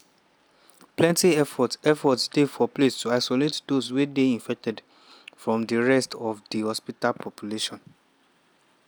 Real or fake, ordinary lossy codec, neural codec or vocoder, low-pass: real; none; none; none